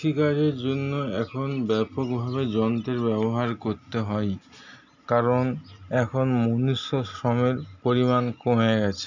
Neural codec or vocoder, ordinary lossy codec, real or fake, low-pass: none; none; real; 7.2 kHz